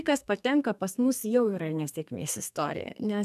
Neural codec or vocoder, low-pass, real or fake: codec, 32 kHz, 1.9 kbps, SNAC; 14.4 kHz; fake